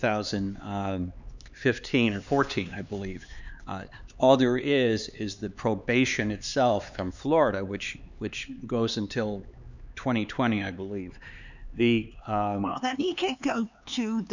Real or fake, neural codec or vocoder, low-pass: fake; codec, 16 kHz, 4 kbps, X-Codec, HuBERT features, trained on LibriSpeech; 7.2 kHz